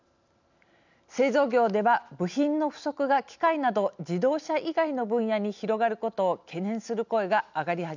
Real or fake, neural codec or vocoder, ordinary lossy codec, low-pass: real; none; none; 7.2 kHz